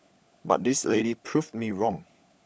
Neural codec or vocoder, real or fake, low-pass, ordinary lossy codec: codec, 16 kHz, 16 kbps, FunCodec, trained on LibriTTS, 50 frames a second; fake; none; none